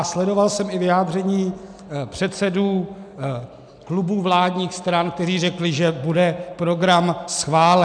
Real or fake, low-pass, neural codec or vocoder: real; 9.9 kHz; none